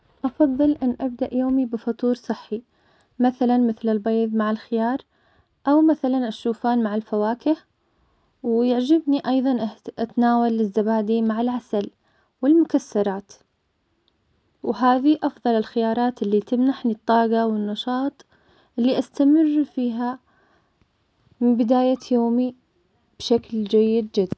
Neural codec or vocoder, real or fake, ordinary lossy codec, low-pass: none; real; none; none